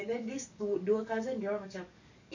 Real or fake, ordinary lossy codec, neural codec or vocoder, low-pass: fake; none; codec, 44.1 kHz, 7.8 kbps, DAC; 7.2 kHz